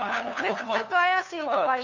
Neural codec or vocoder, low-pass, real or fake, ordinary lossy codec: codec, 16 kHz, 2 kbps, FunCodec, trained on LibriTTS, 25 frames a second; 7.2 kHz; fake; none